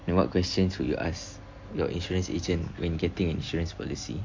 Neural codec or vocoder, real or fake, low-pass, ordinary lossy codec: none; real; 7.2 kHz; MP3, 48 kbps